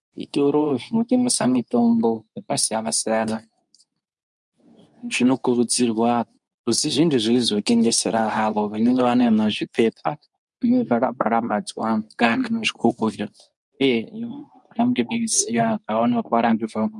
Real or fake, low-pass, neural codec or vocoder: fake; 10.8 kHz; codec, 24 kHz, 0.9 kbps, WavTokenizer, medium speech release version 2